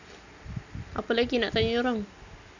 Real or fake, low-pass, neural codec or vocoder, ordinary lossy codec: real; 7.2 kHz; none; none